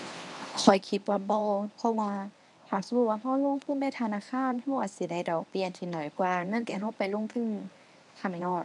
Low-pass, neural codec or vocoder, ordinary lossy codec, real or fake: 10.8 kHz; codec, 24 kHz, 0.9 kbps, WavTokenizer, medium speech release version 1; none; fake